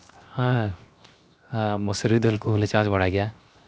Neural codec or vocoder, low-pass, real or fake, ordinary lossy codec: codec, 16 kHz, 0.7 kbps, FocalCodec; none; fake; none